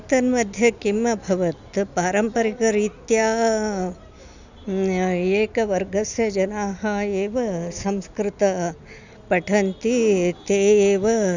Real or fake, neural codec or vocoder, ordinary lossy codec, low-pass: real; none; none; 7.2 kHz